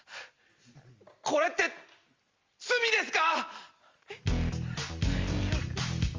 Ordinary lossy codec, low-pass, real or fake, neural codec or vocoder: Opus, 32 kbps; 7.2 kHz; real; none